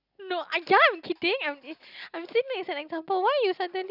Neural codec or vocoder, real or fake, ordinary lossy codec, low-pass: none; real; none; 5.4 kHz